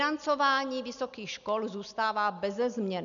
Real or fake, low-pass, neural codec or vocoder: real; 7.2 kHz; none